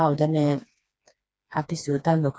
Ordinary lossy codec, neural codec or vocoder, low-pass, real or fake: none; codec, 16 kHz, 2 kbps, FreqCodec, smaller model; none; fake